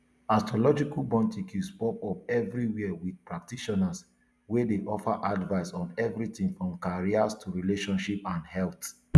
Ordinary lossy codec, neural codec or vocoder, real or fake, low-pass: none; none; real; none